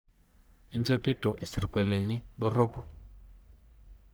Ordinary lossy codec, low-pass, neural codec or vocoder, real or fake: none; none; codec, 44.1 kHz, 1.7 kbps, Pupu-Codec; fake